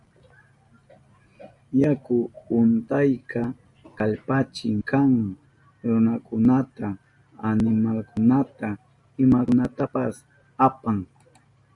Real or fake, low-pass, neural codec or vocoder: real; 10.8 kHz; none